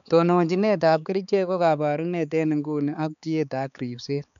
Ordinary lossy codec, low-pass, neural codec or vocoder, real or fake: none; 7.2 kHz; codec, 16 kHz, 4 kbps, X-Codec, HuBERT features, trained on balanced general audio; fake